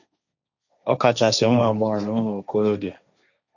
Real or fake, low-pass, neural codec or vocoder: fake; 7.2 kHz; codec, 16 kHz, 1.1 kbps, Voila-Tokenizer